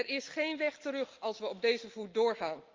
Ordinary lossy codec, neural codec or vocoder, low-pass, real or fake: Opus, 32 kbps; none; 7.2 kHz; real